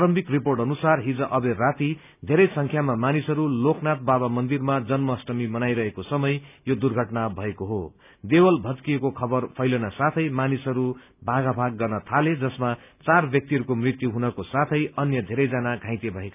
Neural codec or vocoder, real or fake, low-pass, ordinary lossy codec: none; real; 3.6 kHz; none